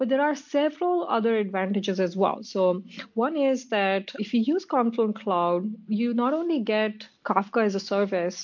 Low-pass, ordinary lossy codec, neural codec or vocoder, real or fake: 7.2 kHz; MP3, 48 kbps; none; real